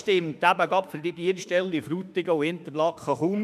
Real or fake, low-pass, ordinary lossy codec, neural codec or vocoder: fake; 14.4 kHz; none; autoencoder, 48 kHz, 32 numbers a frame, DAC-VAE, trained on Japanese speech